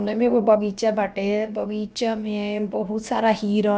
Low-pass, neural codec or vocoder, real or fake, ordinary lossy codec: none; codec, 16 kHz, about 1 kbps, DyCAST, with the encoder's durations; fake; none